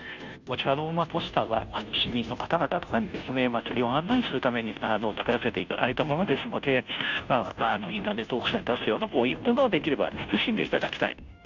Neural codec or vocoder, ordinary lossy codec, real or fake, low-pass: codec, 16 kHz, 0.5 kbps, FunCodec, trained on Chinese and English, 25 frames a second; none; fake; 7.2 kHz